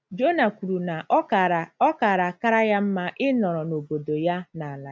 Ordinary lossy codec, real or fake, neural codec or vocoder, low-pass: none; real; none; none